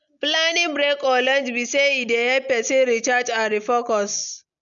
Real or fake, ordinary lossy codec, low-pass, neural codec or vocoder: real; MP3, 96 kbps; 7.2 kHz; none